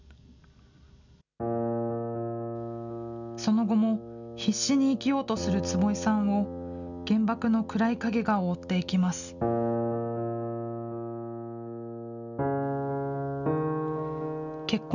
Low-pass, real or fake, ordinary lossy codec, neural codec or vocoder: 7.2 kHz; real; none; none